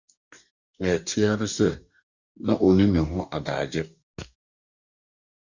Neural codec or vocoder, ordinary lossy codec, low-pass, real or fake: codec, 44.1 kHz, 2.6 kbps, DAC; Opus, 64 kbps; 7.2 kHz; fake